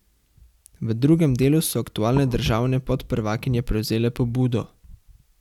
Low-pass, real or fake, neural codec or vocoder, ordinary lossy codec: 19.8 kHz; real; none; none